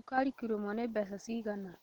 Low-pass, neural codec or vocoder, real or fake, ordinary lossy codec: 19.8 kHz; none; real; Opus, 16 kbps